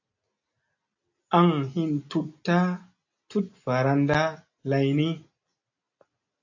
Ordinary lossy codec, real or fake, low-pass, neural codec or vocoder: AAC, 48 kbps; real; 7.2 kHz; none